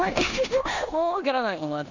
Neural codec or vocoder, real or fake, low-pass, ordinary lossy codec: codec, 16 kHz in and 24 kHz out, 0.9 kbps, LongCat-Audio-Codec, four codebook decoder; fake; 7.2 kHz; none